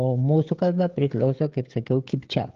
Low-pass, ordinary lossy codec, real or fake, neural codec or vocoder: 7.2 kHz; Opus, 32 kbps; fake; codec, 16 kHz, 16 kbps, FreqCodec, smaller model